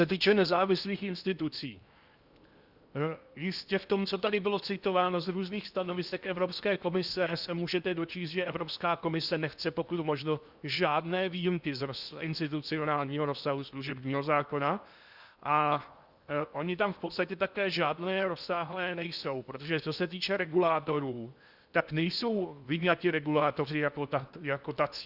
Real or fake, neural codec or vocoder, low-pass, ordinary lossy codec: fake; codec, 16 kHz in and 24 kHz out, 0.8 kbps, FocalCodec, streaming, 65536 codes; 5.4 kHz; Opus, 64 kbps